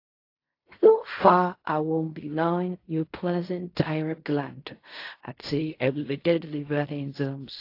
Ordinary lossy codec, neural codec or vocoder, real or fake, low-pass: AAC, 32 kbps; codec, 16 kHz in and 24 kHz out, 0.4 kbps, LongCat-Audio-Codec, fine tuned four codebook decoder; fake; 5.4 kHz